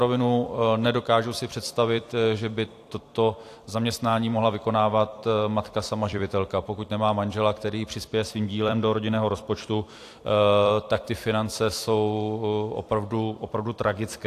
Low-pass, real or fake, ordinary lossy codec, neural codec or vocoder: 14.4 kHz; fake; AAC, 64 kbps; vocoder, 44.1 kHz, 128 mel bands every 256 samples, BigVGAN v2